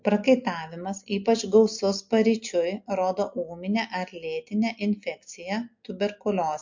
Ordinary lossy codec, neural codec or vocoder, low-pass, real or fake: MP3, 48 kbps; none; 7.2 kHz; real